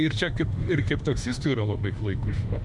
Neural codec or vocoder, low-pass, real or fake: autoencoder, 48 kHz, 32 numbers a frame, DAC-VAE, trained on Japanese speech; 10.8 kHz; fake